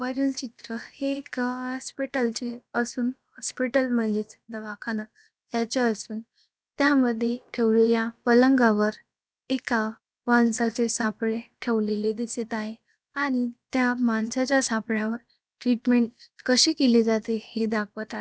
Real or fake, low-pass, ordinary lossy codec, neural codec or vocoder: fake; none; none; codec, 16 kHz, about 1 kbps, DyCAST, with the encoder's durations